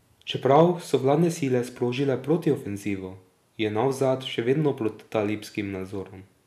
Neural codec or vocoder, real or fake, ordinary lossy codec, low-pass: none; real; none; 14.4 kHz